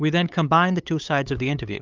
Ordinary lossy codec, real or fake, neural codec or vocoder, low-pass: Opus, 32 kbps; fake; codec, 16 kHz, 8 kbps, FunCodec, trained on LibriTTS, 25 frames a second; 7.2 kHz